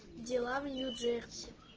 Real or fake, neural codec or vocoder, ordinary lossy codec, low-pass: real; none; Opus, 16 kbps; 7.2 kHz